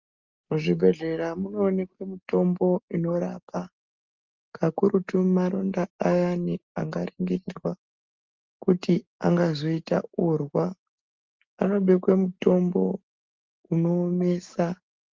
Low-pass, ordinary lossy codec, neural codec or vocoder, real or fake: 7.2 kHz; Opus, 32 kbps; none; real